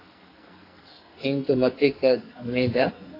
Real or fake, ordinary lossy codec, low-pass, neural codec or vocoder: fake; AAC, 32 kbps; 5.4 kHz; codec, 44.1 kHz, 2.6 kbps, SNAC